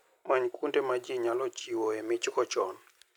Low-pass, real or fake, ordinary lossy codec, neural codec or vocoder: 19.8 kHz; real; none; none